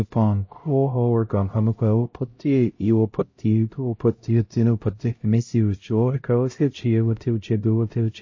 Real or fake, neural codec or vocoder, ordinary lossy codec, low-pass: fake; codec, 16 kHz, 0.5 kbps, X-Codec, HuBERT features, trained on LibriSpeech; MP3, 32 kbps; 7.2 kHz